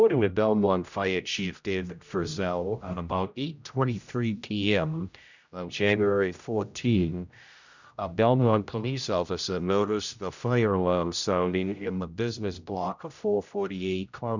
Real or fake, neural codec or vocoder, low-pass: fake; codec, 16 kHz, 0.5 kbps, X-Codec, HuBERT features, trained on general audio; 7.2 kHz